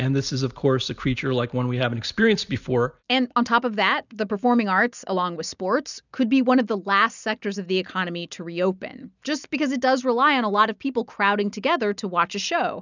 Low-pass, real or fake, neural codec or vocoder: 7.2 kHz; real; none